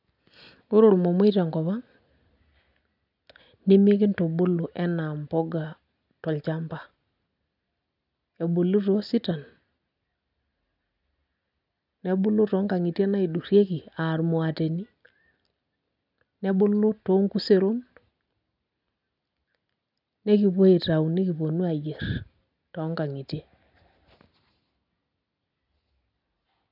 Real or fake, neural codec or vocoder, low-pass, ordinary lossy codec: real; none; 5.4 kHz; none